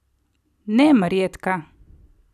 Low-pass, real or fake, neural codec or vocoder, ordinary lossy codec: 14.4 kHz; real; none; none